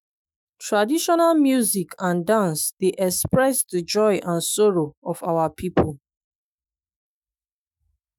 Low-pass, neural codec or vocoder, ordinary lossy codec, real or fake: none; autoencoder, 48 kHz, 128 numbers a frame, DAC-VAE, trained on Japanese speech; none; fake